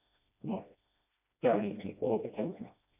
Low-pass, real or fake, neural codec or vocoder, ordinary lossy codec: 3.6 kHz; fake; codec, 16 kHz, 1 kbps, FreqCodec, smaller model; none